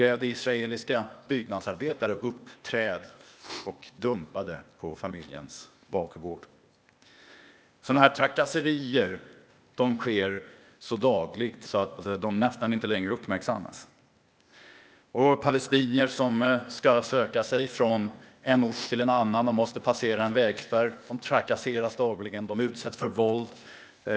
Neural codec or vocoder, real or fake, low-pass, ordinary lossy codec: codec, 16 kHz, 0.8 kbps, ZipCodec; fake; none; none